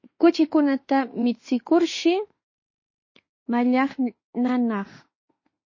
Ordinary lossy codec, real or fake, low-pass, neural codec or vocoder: MP3, 32 kbps; fake; 7.2 kHz; autoencoder, 48 kHz, 32 numbers a frame, DAC-VAE, trained on Japanese speech